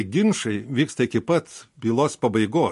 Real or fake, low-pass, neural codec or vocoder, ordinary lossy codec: real; 14.4 kHz; none; MP3, 48 kbps